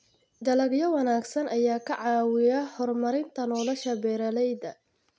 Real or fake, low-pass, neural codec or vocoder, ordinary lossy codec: real; none; none; none